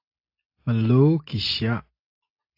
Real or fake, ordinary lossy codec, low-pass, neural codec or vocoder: real; AAC, 32 kbps; 5.4 kHz; none